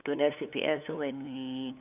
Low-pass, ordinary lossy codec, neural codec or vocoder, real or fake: 3.6 kHz; none; codec, 16 kHz, 8 kbps, FunCodec, trained on LibriTTS, 25 frames a second; fake